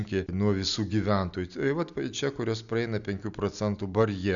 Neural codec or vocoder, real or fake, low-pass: none; real; 7.2 kHz